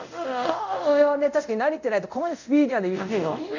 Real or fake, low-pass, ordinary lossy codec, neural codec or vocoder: fake; 7.2 kHz; none; codec, 24 kHz, 0.5 kbps, DualCodec